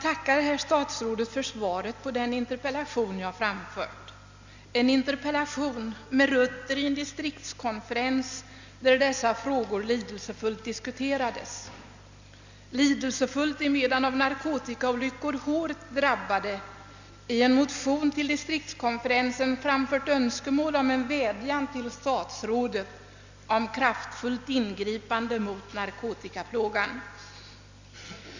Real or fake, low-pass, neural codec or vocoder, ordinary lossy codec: real; 7.2 kHz; none; Opus, 64 kbps